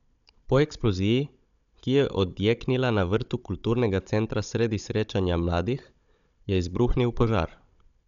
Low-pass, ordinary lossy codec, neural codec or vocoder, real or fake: 7.2 kHz; none; codec, 16 kHz, 16 kbps, FunCodec, trained on Chinese and English, 50 frames a second; fake